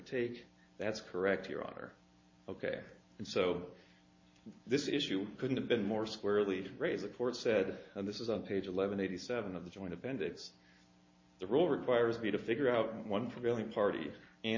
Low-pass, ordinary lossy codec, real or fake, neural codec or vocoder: 7.2 kHz; MP3, 32 kbps; real; none